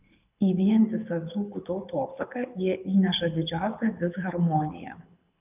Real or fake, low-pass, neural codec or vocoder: fake; 3.6 kHz; codec, 24 kHz, 6 kbps, HILCodec